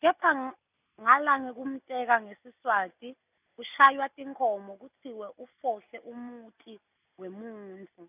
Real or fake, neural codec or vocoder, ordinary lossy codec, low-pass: real; none; none; 3.6 kHz